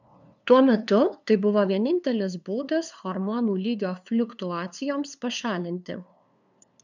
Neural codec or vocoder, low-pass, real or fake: codec, 16 kHz, 2 kbps, FunCodec, trained on LibriTTS, 25 frames a second; 7.2 kHz; fake